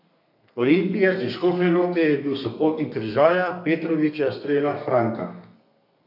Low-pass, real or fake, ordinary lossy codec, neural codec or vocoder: 5.4 kHz; fake; none; codec, 32 kHz, 1.9 kbps, SNAC